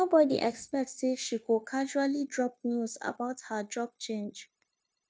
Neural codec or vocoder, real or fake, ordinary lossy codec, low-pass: codec, 16 kHz, 0.9 kbps, LongCat-Audio-Codec; fake; none; none